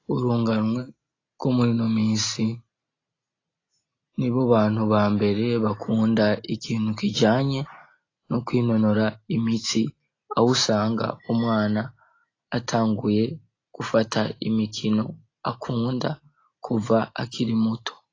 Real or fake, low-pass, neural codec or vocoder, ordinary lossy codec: real; 7.2 kHz; none; AAC, 32 kbps